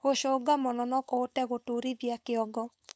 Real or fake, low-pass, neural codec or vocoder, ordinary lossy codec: fake; none; codec, 16 kHz, 4.8 kbps, FACodec; none